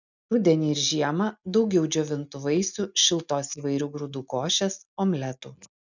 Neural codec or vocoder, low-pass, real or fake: none; 7.2 kHz; real